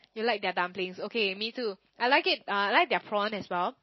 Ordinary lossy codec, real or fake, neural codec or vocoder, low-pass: MP3, 24 kbps; real; none; 7.2 kHz